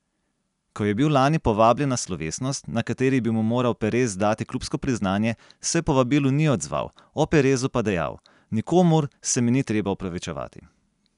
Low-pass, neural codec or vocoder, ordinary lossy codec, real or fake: 10.8 kHz; none; none; real